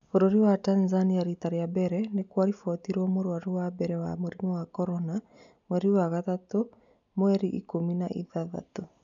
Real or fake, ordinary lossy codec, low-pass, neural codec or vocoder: real; none; 7.2 kHz; none